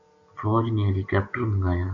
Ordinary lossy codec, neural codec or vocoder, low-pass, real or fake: AAC, 48 kbps; none; 7.2 kHz; real